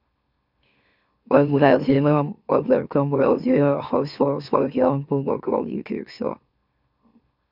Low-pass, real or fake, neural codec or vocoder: 5.4 kHz; fake; autoencoder, 44.1 kHz, a latent of 192 numbers a frame, MeloTTS